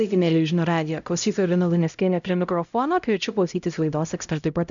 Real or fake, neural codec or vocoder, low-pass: fake; codec, 16 kHz, 0.5 kbps, X-Codec, HuBERT features, trained on LibriSpeech; 7.2 kHz